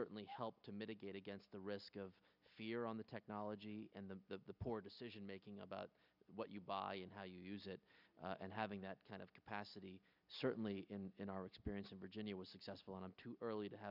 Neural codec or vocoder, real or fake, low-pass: none; real; 5.4 kHz